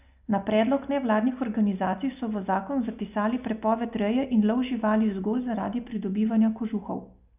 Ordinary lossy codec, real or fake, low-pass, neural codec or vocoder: none; real; 3.6 kHz; none